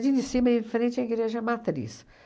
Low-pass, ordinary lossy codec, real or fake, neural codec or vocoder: none; none; real; none